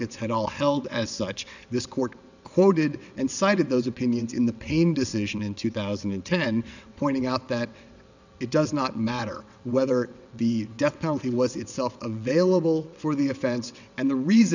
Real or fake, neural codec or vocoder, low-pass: fake; vocoder, 22.05 kHz, 80 mel bands, WaveNeXt; 7.2 kHz